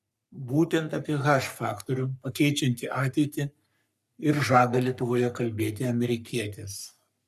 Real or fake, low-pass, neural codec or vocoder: fake; 14.4 kHz; codec, 44.1 kHz, 3.4 kbps, Pupu-Codec